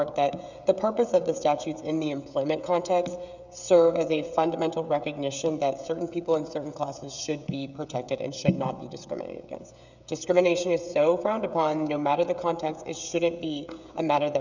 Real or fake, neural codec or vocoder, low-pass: fake; codec, 16 kHz, 16 kbps, FreqCodec, smaller model; 7.2 kHz